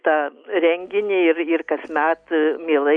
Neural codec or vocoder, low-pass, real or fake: none; 9.9 kHz; real